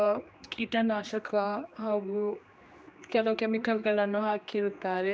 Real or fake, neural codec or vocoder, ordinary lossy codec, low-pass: fake; codec, 16 kHz, 2 kbps, X-Codec, HuBERT features, trained on general audio; none; none